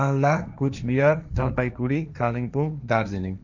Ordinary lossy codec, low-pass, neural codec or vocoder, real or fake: none; 7.2 kHz; codec, 16 kHz, 1.1 kbps, Voila-Tokenizer; fake